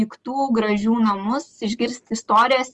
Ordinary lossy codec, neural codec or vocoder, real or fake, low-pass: Opus, 24 kbps; none; real; 10.8 kHz